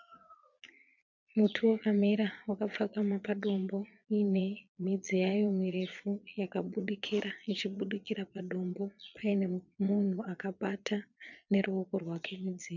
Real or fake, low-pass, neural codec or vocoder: fake; 7.2 kHz; vocoder, 22.05 kHz, 80 mel bands, Vocos